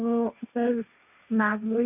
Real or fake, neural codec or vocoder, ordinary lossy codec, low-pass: fake; codec, 16 kHz, 1.1 kbps, Voila-Tokenizer; none; 3.6 kHz